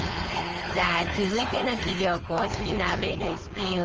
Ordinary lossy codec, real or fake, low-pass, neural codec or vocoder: Opus, 24 kbps; fake; 7.2 kHz; codec, 16 kHz, 4.8 kbps, FACodec